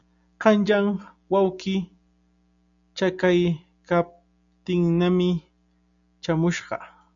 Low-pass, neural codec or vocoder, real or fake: 7.2 kHz; none; real